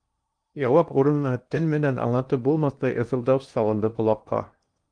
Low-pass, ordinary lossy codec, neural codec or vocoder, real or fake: 9.9 kHz; Opus, 32 kbps; codec, 16 kHz in and 24 kHz out, 0.8 kbps, FocalCodec, streaming, 65536 codes; fake